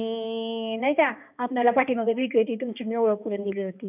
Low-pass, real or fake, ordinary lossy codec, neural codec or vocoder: 3.6 kHz; fake; none; codec, 16 kHz, 2 kbps, X-Codec, HuBERT features, trained on balanced general audio